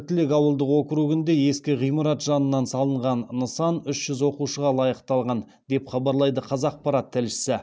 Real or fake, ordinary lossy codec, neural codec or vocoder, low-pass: real; none; none; none